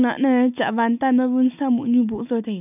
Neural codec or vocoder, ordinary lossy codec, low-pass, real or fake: none; none; 3.6 kHz; real